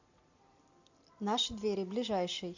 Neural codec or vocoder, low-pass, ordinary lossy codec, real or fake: none; 7.2 kHz; AAC, 48 kbps; real